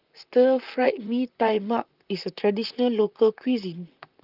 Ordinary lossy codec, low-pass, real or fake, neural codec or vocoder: Opus, 24 kbps; 5.4 kHz; fake; vocoder, 44.1 kHz, 128 mel bands, Pupu-Vocoder